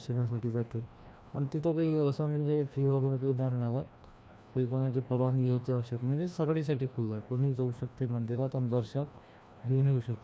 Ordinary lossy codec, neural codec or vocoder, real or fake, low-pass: none; codec, 16 kHz, 1 kbps, FreqCodec, larger model; fake; none